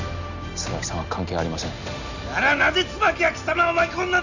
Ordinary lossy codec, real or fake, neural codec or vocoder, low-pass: none; real; none; 7.2 kHz